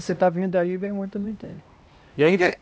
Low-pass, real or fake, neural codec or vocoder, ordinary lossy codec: none; fake; codec, 16 kHz, 1 kbps, X-Codec, HuBERT features, trained on LibriSpeech; none